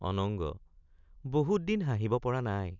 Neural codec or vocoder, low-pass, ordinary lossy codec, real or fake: none; 7.2 kHz; none; real